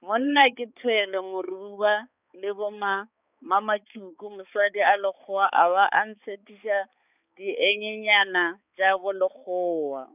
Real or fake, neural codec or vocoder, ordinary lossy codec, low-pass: fake; codec, 16 kHz, 4 kbps, X-Codec, HuBERT features, trained on balanced general audio; none; 3.6 kHz